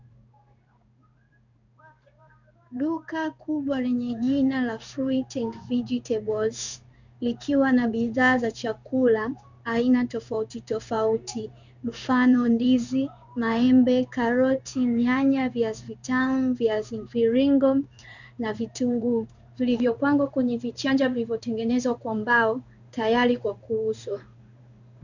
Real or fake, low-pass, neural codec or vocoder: fake; 7.2 kHz; codec, 16 kHz in and 24 kHz out, 1 kbps, XY-Tokenizer